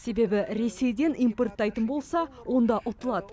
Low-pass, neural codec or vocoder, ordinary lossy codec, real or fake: none; none; none; real